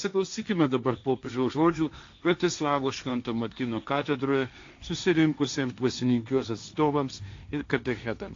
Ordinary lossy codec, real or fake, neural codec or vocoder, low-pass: AAC, 48 kbps; fake; codec, 16 kHz, 1.1 kbps, Voila-Tokenizer; 7.2 kHz